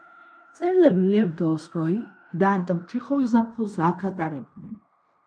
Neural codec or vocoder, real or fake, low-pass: codec, 16 kHz in and 24 kHz out, 0.9 kbps, LongCat-Audio-Codec, fine tuned four codebook decoder; fake; 9.9 kHz